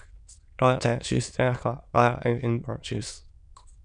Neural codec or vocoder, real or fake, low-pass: autoencoder, 22.05 kHz, a latent of 192 numbers a frame, VITS, trained on many speakers; fake; 9.9 kHz